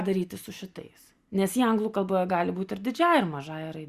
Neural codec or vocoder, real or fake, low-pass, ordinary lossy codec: none; real; 14.4 kHz; Opus, 64 kbps